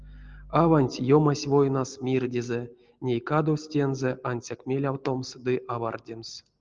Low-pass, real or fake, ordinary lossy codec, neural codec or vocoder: 7.2 kHz; real; Opus, 24 kbps; none